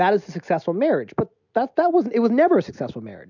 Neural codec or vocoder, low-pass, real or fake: none; 7.2 kHz; real